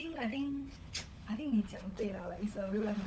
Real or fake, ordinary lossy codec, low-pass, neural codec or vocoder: fake; none; none; codec, 16 kHz, 16 kbps, FunCodec, trained on LibriTTS, 50 frames a second